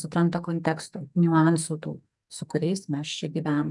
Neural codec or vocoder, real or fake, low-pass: codec, 44.1 kHz, 2.6 kbps, SNAC; fake; 10.8 kHz